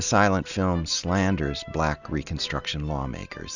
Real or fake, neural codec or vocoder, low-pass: real; none; 7.2 kHz